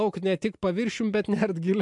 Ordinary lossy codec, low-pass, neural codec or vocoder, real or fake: MP3, 64 kbps; 10.8 kHz; none; real